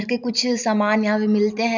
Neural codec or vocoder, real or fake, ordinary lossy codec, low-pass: none; real; none; 7.2 kHz